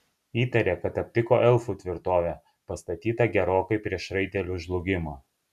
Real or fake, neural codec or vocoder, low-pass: real; none; 14.4 kHz